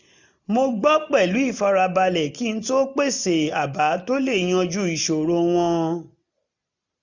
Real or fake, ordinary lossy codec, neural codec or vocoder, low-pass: real; none; none; 7.2 kHz